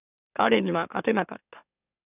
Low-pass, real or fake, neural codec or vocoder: 3.6 kHz; fake; autoencoder, 44.1 kHz, a latent of 192 numbers a frame, MeloTTS